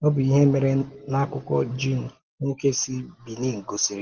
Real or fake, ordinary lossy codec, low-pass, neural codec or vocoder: real; Opus, 16 kbps; 7.2 kHz; none